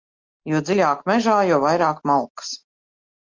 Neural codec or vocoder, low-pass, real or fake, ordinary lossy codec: none; 7.2 kHz; real; Opus, 32 kbps